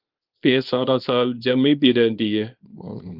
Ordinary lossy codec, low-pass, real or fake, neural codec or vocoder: Opus, 24 kbps; 5.4 kHz; fake; codec, 24 kHz, 0.9 kbps, WavTokenizer, small release